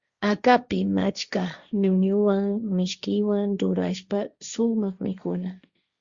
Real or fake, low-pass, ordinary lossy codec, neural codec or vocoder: fake; 7.2 kHz; Opus, 64 kbps; codec, 16 kHz, 1.1 kbps, Voila-Tokenizer